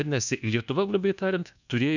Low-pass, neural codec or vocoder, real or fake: 7.2 kHz; codec, 24 kHz, 1.2 kbps, DualCodec; fake